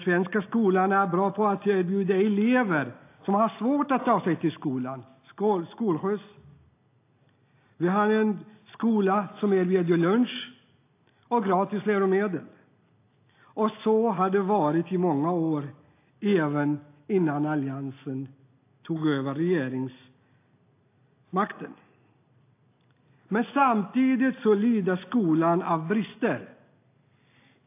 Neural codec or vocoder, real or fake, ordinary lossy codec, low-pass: none; real; AAC, 24 kbps; 3.6 kHz